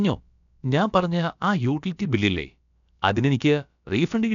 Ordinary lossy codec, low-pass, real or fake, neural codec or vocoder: none; 7.2 kHz; fake; codec, 16 kHz, about 1 kbps, DyCAST, with the encoder's durations